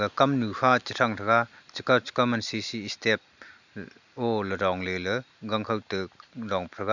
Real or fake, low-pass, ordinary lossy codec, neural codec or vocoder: real; 7.2 kHz; none; none